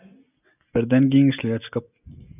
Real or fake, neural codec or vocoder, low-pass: real; none; 3.6 kHz